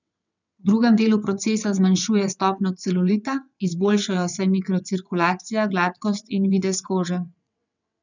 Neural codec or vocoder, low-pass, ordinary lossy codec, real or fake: vocoder, 22.05 kHz, 80 mel bands, WaveNeXt; 7.2 kHz; none; fake